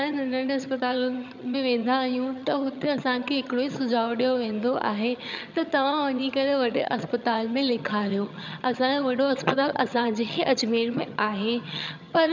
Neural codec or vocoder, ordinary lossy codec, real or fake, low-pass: vocoder, 22.05 kHz, 80 mel bands, HiFi-GAN; none; fake; 7.2 kHz